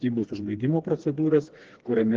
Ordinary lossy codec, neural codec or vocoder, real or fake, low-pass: Opus, 16 kbps; codec, 16 kHz, 2 kbps, FreqCodec, smaller model; fake; 7.2 kHz